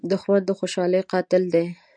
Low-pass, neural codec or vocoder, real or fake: 9.9 kHz; none; real